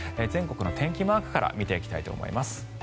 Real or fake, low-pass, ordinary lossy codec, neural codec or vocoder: real; none; none; none